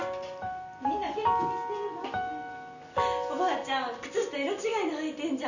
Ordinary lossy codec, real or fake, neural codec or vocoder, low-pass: none; real; none; 7.2 kHz